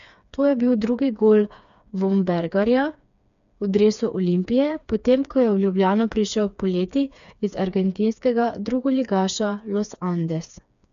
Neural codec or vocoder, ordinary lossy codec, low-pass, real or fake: codec, 16 kHz, 4 kbps, FreqCodec, smaller model; none; 7.2 kHz; fake